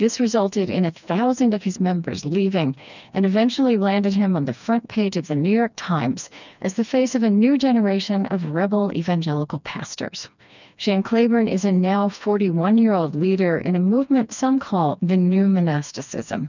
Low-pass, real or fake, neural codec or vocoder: 7.2 kHz; fake; codec, 16 kHz, 2 kbps, FreqCodec, smaller model